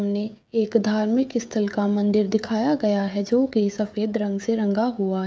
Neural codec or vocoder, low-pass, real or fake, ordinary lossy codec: codec, 16 kHz, 16 kbps, FreqCodec, smaller model; none; fake; none